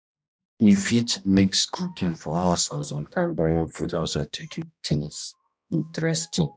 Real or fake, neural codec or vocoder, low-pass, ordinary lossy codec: fake; codec, 16 kHz, 1 kbps, X-Codec, HuBERT features, trained on balanced general audio; none; none